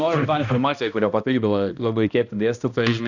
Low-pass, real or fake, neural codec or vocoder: 7.2 kHz; fake; codec, 16 kHz, 1 kbps, X-Codec, HuBERT features, trained on balanced general audio